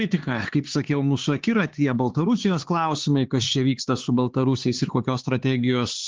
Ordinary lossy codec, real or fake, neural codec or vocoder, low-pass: Opus, 16 kbps; fake; codec, 16 kHz, 4 kbps, X-Codec, HuBERT features, trained on LibriSpeech; 7.2 kHz